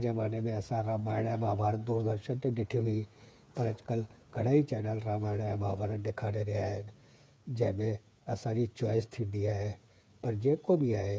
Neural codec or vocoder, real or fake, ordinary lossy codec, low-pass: codec, 16 kHz, 8 kbps, FreqCodec, smaller model; fake; none; none